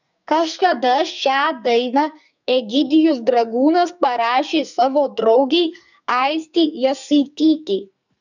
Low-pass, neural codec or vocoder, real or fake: 7.2 kHz; codec, 44.1 kHz, 2.6 kbps, SNAC; fake